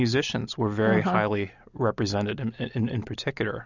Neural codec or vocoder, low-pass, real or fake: none; 7.2 kHz; real